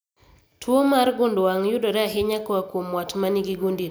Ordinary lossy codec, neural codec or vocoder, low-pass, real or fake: none; none; none; real